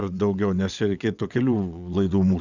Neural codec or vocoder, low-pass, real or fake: vocoder, 22.05 kHz, 80 mel bands, WaveNeXt; 7.2 kHz; fake